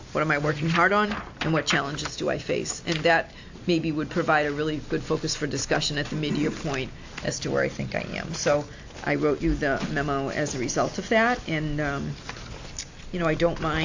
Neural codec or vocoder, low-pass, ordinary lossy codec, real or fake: none; 7.2 kHz; AAC, 48 kbps; real